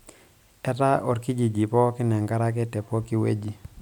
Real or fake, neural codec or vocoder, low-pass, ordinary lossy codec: real; none; 19.8 kHz; none